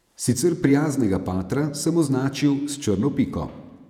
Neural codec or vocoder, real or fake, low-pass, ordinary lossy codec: vocoder, 48 kHz, 128 mel bands, Vocos; fake; 19.8 kHz; none